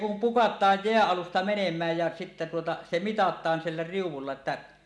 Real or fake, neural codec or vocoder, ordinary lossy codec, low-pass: real; none; none; none